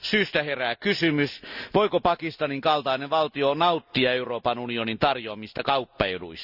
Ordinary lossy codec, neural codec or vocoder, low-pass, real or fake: none; none; 5.4 kHz; real